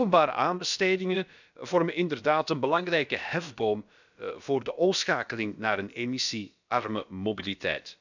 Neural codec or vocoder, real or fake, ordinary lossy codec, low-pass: codec, 16 kHz, about 1 kbps, DyCAST, with the encoder's durations; fake; none; 7.2 kHz